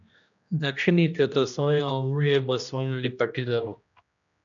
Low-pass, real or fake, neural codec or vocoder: 7.2 kHz; fake; codec, 16 kHz, 1 kbps, X-Codec, HuBERT features, trained on general audio